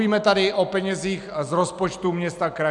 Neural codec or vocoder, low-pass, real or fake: none; 10.8 kHz; real